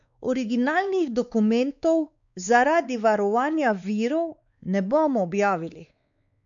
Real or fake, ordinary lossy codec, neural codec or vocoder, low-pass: fake; none; codec, 16 kHz, 4 kbps, X-Codec, WavLM features, trained on Multilingual LibriSpeech; 7.2 kHz